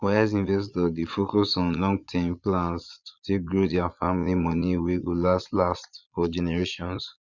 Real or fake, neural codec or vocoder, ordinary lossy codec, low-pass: fake; vocoder, 22.05 kHz, 80 mel bands, Vocos; none; 7.2 kHz